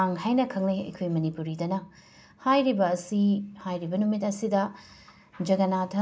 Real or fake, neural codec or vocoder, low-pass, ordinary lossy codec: real; none; none; none